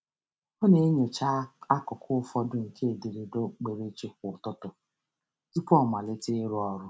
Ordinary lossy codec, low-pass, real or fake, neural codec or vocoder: none; none; real; none